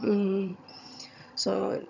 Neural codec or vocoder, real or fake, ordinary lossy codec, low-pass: vocoder, 22.05 kHz, 80 mel bands, HiFi-GAN; fake; none; 7.2 kHz